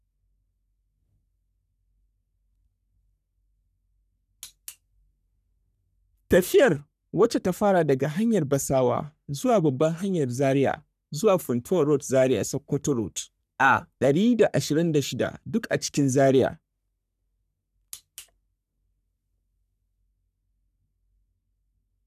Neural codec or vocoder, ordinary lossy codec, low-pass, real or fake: codec, 44.1 kHz, 3.4 kbps, Pupu-Codec; none; 14.4 kHz; fake